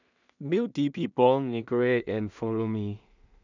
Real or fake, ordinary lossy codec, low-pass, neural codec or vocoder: fake; none; 7.2 kHz; codec, 16 kHz in and 24 kHz out, 0.4 kbps, LongCat-Audio-Codec, two codebook decoder